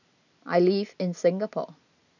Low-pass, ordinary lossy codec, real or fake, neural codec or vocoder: 7.2 kHz; none; real; none